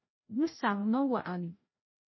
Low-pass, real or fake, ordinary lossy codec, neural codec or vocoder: 7.2 kHz; fake; MP3, 24 kbps; codec, 16 kHz, 0.5 kbps, FreqCodec, larger model